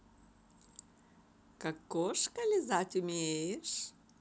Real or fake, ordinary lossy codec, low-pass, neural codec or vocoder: real; none; none; none